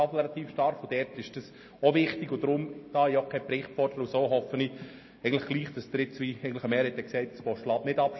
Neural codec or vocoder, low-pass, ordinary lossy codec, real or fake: none; 7.2 kHz; MP3, 24 kbps; real